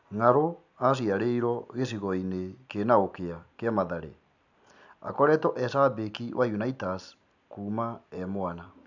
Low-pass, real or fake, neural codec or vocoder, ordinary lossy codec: 7.2 kHz; real; none; none